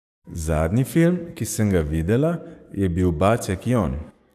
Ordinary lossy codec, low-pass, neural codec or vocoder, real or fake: none; 14.4 kHz; codec, 44.1 kHz, 7.8 kbps, DAC; fake